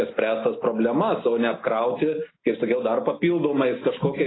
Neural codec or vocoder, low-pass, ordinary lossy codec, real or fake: none; 7.2 kHz; AAC, 16 kbps; real